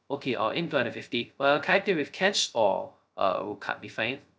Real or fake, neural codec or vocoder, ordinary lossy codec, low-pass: fake; codec, 16 kHz, 0.2 kbps, FocalCodec; none; none